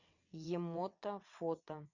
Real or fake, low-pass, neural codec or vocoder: fake; 7.2 kHz; vocoder, 22.05 kHz, 80 mel bands, WaveNeXt